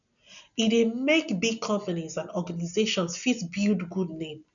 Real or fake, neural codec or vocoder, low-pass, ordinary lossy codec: real; none; 7.2 kHz; none